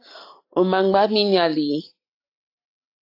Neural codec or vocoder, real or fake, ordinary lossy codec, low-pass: none; real; AAC, 32 kbps; 5.4 kHz